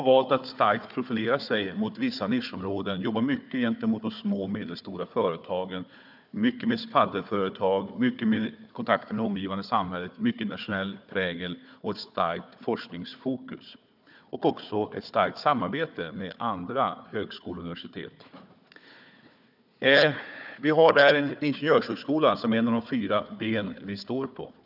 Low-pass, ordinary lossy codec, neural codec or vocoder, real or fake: 5.4 kHz; none; codec, 16 kHz, 4 kbps, FunCodec, trained on Chinese and English, 50 frames a second; fake